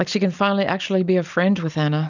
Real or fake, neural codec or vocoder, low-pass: real; none; 7.2 kHz